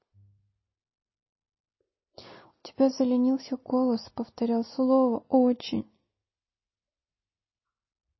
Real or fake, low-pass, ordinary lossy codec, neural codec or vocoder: real; 7.2 kHz; MP3, 24 kbps; none